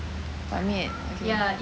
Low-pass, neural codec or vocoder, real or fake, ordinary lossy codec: none; none; real; none